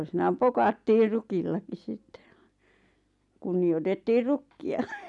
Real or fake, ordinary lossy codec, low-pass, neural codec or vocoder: real; none; 10.8 kHz; none